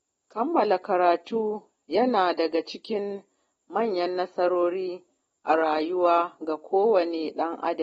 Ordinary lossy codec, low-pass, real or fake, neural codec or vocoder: AAC, 24 kbps; 19.8 kHz; real; none